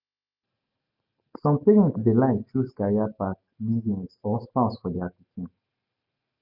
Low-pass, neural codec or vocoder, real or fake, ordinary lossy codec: 5.4 kHz; none; real; none